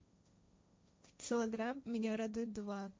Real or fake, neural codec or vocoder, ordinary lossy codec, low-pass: fake; codec, 16 kHz, 1.1 kbps, Voila-Tokenizer; none; none